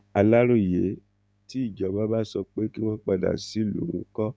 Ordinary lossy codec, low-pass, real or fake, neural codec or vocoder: none; none; fake; codec, 16 kHz, 6 kbps, DAC